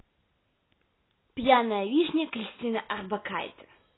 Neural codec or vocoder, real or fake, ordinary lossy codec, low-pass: none; real; AAC, 16 kbps; 7.2 kHz